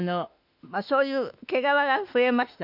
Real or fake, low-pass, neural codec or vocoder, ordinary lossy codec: fake; 5.4 kHz; autoencoder, 48 kHz, 32 numbers a frame, DAC-VAE, trained on Japanese speech; none